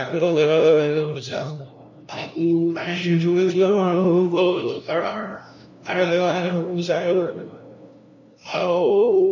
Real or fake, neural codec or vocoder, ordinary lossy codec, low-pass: fake; codec, 16 kHz, 0.5 kbps, FunCodec, trained on LibriTTS, 25 frames a second; none; 7.2 kHz